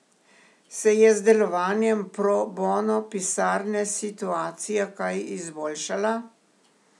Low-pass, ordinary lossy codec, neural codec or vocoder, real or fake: none; none; none; real